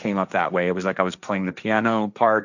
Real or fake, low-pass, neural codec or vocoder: fake; 7.2 kHz; codec, 16 kHz, 1.1 kbps, Voila-Tokenizer